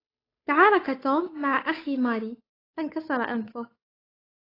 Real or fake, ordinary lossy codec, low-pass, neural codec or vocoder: fake; AAC, 24 kbps; 5.4 kHz; codec, 16 kHz, 8 kbps, FunCodec, trained on Chinese and English, 25 frames a second